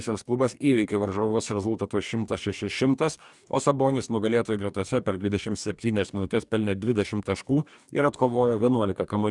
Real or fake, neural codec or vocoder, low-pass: fake; codec, 44.1 kHz, 2.6 kbps, DAC; 10.8 kHz